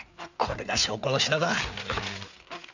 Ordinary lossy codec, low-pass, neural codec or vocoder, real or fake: none; 7.2 kHz; codec, 16 kHz, 4 kbps, FreqCodec, larger model; fake